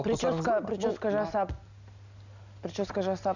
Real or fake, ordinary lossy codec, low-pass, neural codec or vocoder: real; none; 7.2 kHz; none